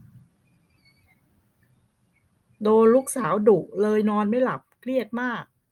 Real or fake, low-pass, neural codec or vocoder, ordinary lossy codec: real; 19.8 kHz; none; Opus, 32 kbps